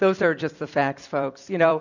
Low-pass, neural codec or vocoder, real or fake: 7.2 kHz; vocoder, 44.1 kHz, 128 mel bands every 256 samples, BigVGAN v2; fake